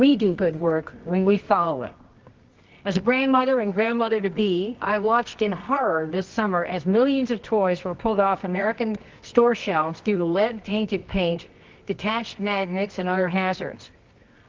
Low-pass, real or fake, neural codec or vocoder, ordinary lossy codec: 7.2 kHz; fake; codec, 24 kHz, 0.9 kbps, WavTokenizer, medium music audio release; Opus, 24 kbps